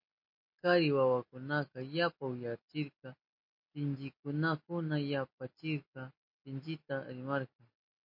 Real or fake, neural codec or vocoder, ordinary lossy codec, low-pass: real; none; MP3, 32 kbps; 5.4 kHz